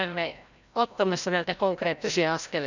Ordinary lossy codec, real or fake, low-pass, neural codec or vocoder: none; fake; 7.2 kHz; codec, 16 kHz, 0.5 kbps, FreqCodec, larger model